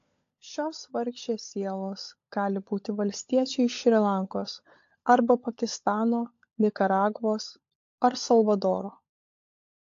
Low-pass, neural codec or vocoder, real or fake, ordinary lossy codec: 7.2 kHz; codec, 16 kHz, 16 kbps, FunCodec, trained on LibriTTS, 50 frames a second; fake; AAC, 48 kbps